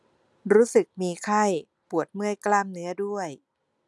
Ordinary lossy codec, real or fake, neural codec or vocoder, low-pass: none; real; none; none